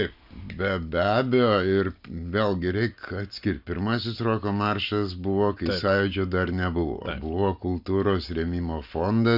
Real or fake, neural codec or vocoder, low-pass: real; none; 5.4 kHz